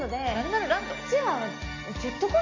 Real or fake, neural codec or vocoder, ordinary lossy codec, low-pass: real; none; AAC, 48 kbps; 7.2 kHz